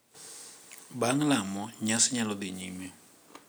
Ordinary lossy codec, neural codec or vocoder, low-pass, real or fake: none; none; none; real